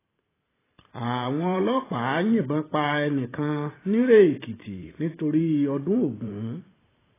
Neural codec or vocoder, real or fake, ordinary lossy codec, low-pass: vocoder, 44.1 kHz, 128 mel bands every 512 samples, BigVGAN v2; fake; AAC, 16 kbps; 3.6 kHz